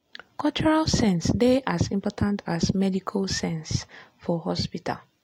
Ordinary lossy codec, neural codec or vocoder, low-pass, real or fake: AAC, 48 kbps; none; 19.8 kHz; real